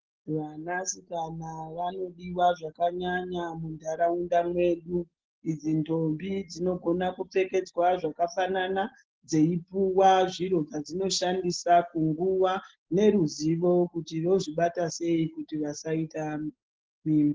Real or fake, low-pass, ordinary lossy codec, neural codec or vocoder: real; 7.2 kHz; Opus, 16 kbps; none